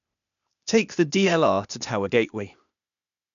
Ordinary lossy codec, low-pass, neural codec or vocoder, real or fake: none; 7.2 kHz; codec, 16 kHz, 0.8 kbps, ZipCodec; fake